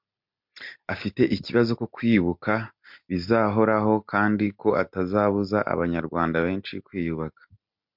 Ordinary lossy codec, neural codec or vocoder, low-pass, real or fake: MP3, 48 kbps; none; 5.4 kHz; real